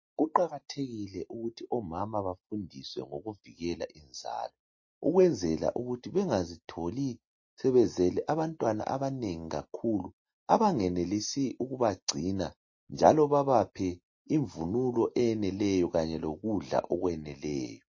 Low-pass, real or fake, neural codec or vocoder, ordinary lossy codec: 7.2 kHz; real; none; MP3, 32 kbps